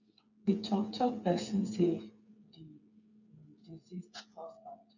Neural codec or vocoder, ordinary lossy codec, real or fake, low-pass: codec, 16 kHz, 8 kbps, FreqCodec, smaller model; none; fake; 7.2 kHz